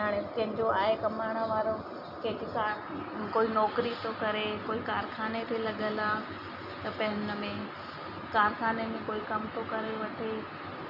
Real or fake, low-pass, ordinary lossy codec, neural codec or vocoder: real; 5.4 kHz; none; none